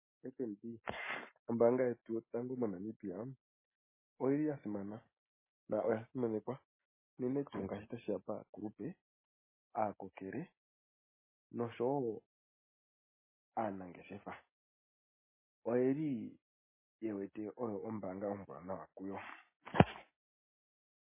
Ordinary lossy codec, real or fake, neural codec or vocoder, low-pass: MP3, 16 kbps; real; none; 3.6 kHz